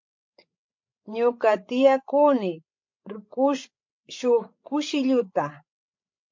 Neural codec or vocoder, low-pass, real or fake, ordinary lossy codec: codec, 16 kHz, 16 kbps, FreqCodec, larger model; 7.2 kHz; fake; MP3, 48 kbps